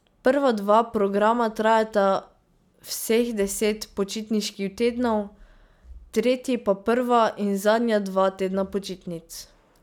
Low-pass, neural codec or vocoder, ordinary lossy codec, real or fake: 19.8 kHz; none; none; real